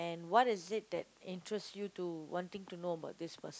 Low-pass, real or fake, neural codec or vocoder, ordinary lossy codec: none; real; none; none